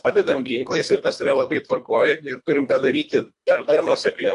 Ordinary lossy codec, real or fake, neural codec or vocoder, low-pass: AAC, 64 kbps; fake; codec, 24 kHz, 1.5 kbps, HILCodec; 10.8 kHz